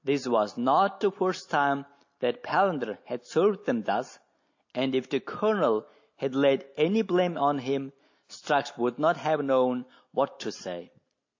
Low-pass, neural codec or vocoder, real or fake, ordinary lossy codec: 7.2 kHz; none; real; AAC, 48 kbps